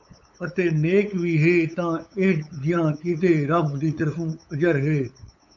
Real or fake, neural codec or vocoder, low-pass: fake; codec, 16 kHz, 8 kbps, FunCodec, trained on LibriTTS, 25 frames a second; 7.2 kHz